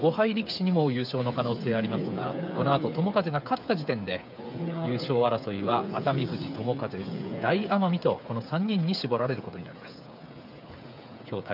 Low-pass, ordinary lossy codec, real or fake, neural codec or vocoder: 5.4 kHz; none; fake; codec, 16 kHz, 8 kbps, FreqCodec, smaller model